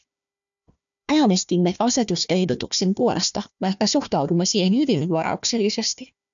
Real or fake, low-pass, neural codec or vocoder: fake; 7.2 kHz; codec, 16 kHz, 1 kbps, FunCodec, trained on Chinese and English, 50 frames a second